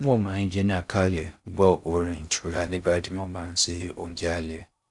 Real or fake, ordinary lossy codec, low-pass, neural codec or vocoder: fake; none; 10.8 kHz; codec, 16 kHz in and 24 kHz out, 0.6 kbps, FocalCodec, streaming, 2048 codes